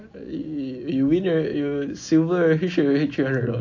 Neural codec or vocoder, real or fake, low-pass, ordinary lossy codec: none; real; 7.2 kHz; AAC, 48 kbps